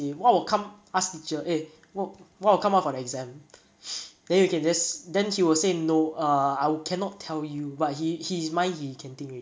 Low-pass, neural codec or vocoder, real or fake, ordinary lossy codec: none; none; real; none